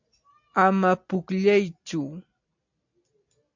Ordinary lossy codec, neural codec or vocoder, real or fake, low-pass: MP3, 48 kbps; none; real; 7.2 kHz